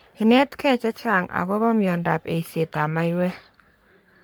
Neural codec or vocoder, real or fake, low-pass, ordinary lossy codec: codec, 44.1 kHz, 3.4 kbps, Pupu-Codec; fake; none; none